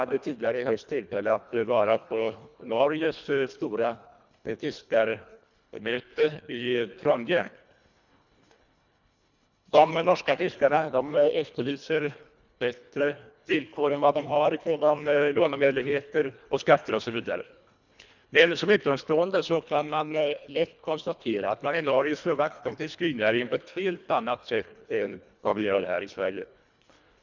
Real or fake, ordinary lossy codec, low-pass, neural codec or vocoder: fake; none; 7.2 kHz; codec, 24 kHz, 1.5 kbps, HILCodec